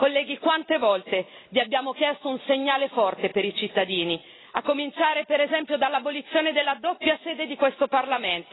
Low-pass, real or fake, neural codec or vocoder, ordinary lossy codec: 7.2 kHz; real; none; AAC, 16 kbps